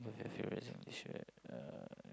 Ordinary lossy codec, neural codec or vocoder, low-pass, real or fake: none; none; none; real